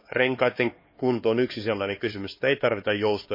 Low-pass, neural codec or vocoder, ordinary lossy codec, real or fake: 5.4 kHz; codec, 16 kHz, 2 kbps, X-Codec, HuBERT features, trained on LibriSpeech; MP3, 24 kbps; fake